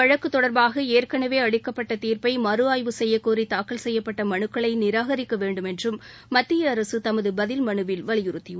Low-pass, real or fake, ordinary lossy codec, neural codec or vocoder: none; real; none; none